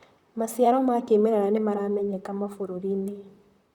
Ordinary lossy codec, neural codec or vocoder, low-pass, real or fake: Opus, 64 kbps; vocoder, 44.1 kHz, 128 mel bands, Pupu-Vocoder; 19.8 kHz; fake